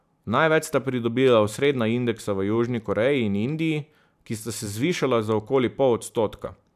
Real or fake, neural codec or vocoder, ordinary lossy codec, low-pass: fake; vocoder, 44.1 kHz, 128 mel bands every 512 samples, BigVGAN v2; none; 14.4 kHz